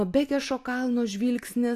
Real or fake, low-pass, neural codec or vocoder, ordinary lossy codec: real; 14.4 kHz; none; AAC, 64 kbps